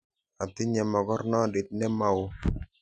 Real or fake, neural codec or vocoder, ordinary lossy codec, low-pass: real; none; none; 9.9 kHz